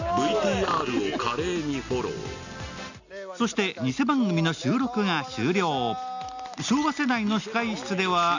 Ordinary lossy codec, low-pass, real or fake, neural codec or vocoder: none; 7.2 kHz; real; none